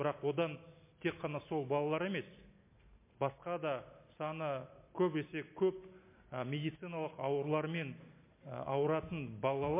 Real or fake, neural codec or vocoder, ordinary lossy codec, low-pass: real; none; MP3, 24 kbps; 3.6 kHz